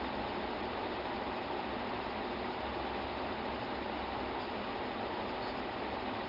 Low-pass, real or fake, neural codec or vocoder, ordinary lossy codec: 5.4 kHz; real; none; none